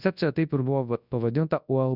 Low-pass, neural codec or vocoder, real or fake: 5.4 kHz; codec, 24 kHz, 0.9 kbps, WavTokenizer, large speech release; fake